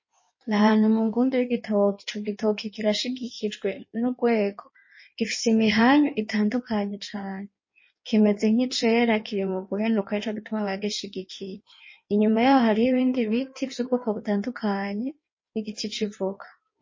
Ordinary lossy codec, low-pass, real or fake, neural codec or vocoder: MP3, 32 kbps; 7.2 kHz; fake; codec, 16 kHz in and 24 kHz out, 1.1 kbps, FireRedTTS-2 codec